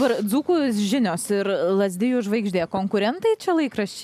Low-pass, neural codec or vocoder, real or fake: 14.4 kHz; none; real